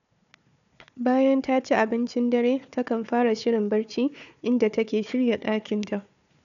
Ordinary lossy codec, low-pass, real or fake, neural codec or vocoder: none; 7.2 kHz; fake; codec, 16 kHz, 4 kbps, FunCodec, trained on Chinese and English, 50 frames a second